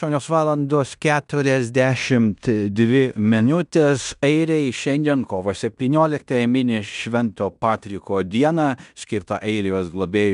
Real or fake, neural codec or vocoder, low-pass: fake; codec, 16 kHz in and 24 kHz out, 0.9 kbps, LongCat-Audio-Codec, fine tuned four codebook decoder; 10.8 kHz